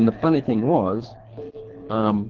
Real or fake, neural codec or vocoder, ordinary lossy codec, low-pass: fake; codec, 24 kHz, 3 kbps, HILCodec; Opus, 16 kbps; 7.2 kHz